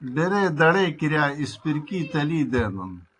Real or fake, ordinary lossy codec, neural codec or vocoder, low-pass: real; AAC, 48 kbps; none; 9.9 kHz